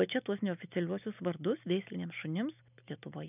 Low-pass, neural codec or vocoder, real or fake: 3.6 kHz; none; real